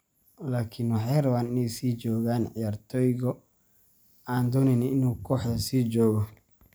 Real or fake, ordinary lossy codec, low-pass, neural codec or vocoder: real; none; none; none